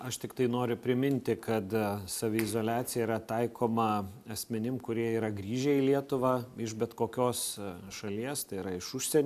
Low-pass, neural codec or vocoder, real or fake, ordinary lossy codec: 14.4 kHz; none; real; AAC, 96 kbps